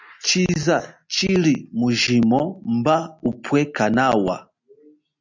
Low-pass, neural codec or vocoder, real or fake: 7.2 kHz; none; real